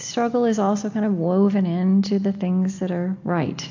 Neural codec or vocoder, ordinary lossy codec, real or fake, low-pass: none; AAC, 48 kbps; real; 7.2 kHz